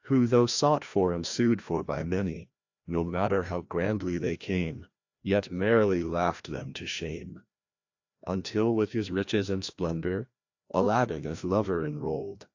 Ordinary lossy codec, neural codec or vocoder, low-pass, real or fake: AAC, 48 kbps; codec, 16 kHz, 1 kbps, FreqCodec, larger model; 7.2 kHz; fake